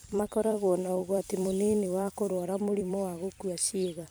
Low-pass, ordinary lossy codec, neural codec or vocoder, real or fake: none; none; vocoder, 44.1 kHz, 128 mel bands every 256 samples, BigVGAN v2; fake